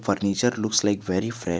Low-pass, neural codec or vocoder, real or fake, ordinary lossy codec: none; none; real; none